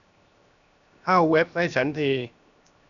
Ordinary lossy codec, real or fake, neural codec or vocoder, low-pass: none; fake; codec, 16 kHz, 0.7 kbps, FocalCodec; 7.2 kHz